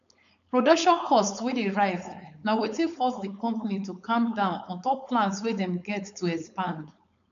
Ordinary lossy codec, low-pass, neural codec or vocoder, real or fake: none; 7.2 kHz; codec, 16 kHz, 4.8 kbps, FACodec; fake